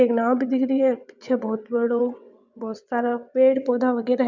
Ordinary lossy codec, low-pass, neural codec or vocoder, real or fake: MP3, 64 kbps; 7.2 kHz; vocoder, 22.05 kHz, 80 mel bands, WaveNeXt; fake